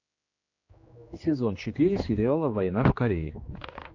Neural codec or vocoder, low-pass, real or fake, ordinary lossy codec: codec, 16 kHz, 2 kbps, X-Codec, HuBERT features, trained on general audio; 7.2 kHz; fake; AAC, 48 kbps